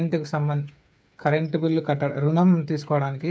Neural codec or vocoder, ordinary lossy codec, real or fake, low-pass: codec, 16 kHz, 8 kbps, FreqCodec, smaller model; none; fake; none